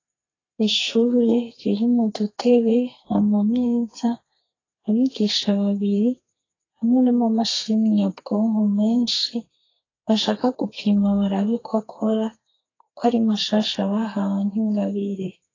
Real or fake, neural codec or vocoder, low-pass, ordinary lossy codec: fake; codec, 32 kHz, 1.9 kbps, SNAC; 7.2 kHz; AAC, 32 kbps